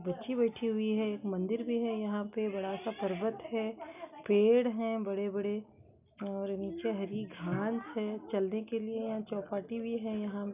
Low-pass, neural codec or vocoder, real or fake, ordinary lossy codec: 3.6 kHz; none; real; none